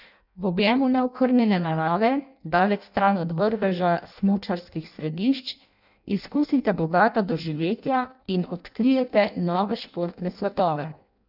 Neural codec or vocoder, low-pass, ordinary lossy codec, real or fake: codec, 16 kHz in and 24 kHz out, 0.6 kbps, FireRedTTS-2 codec; 5.4 kHz; none; fake